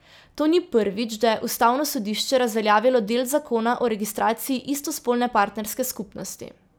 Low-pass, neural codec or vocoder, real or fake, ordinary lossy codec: none; none; real; none